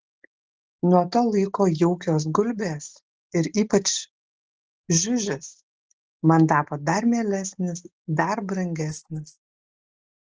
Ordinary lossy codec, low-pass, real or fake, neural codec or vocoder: Opus, 16 kbps; 7.2 kHz; real; none